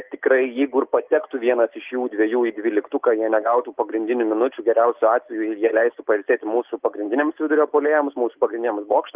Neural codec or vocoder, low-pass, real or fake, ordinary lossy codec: none; 3.6 kHz; real; Opus, 32 kbps